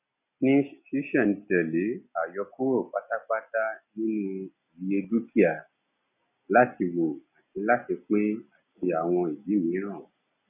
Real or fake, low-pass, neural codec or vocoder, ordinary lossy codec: real; 3.6 kHz; none; none